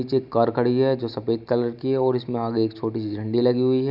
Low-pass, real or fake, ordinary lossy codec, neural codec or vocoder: 5.4 kHz; real; none; none